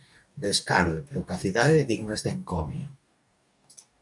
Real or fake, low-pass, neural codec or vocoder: fake; 10.8 kHz; codec, 44.1 kHz, 2.6 kbps, DAC